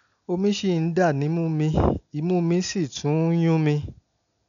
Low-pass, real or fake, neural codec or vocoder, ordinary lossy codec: 7.2 kHz; real; none; none